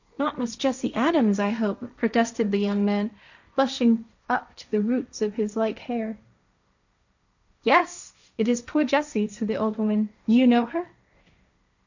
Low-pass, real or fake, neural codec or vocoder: 7.2 kHz; fake; codec, 16 kHz, 1.1 kbps, Voila-Tokenizer